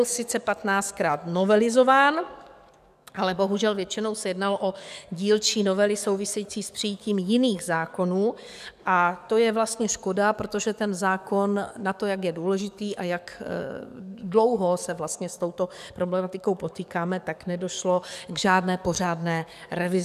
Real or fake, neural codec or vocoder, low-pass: fake; codec, 44.1 kHz, 7.8 kbps, DAC; 14.4 kHz